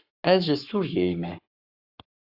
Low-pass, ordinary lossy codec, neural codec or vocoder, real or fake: 5.4 kHz; AAC, 48 kbps; codec, 44.1 kHz, 7.8 kbps, Pupu-Codec; fake